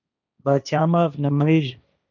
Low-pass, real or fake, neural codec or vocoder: 7.2 kHz; fake; codec, 16 kHz, 1.1 kbps, Voila-Tokenizer